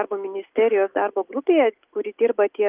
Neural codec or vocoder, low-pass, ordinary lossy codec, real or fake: none; 3.6 kHz; Opus, 32 kbps; real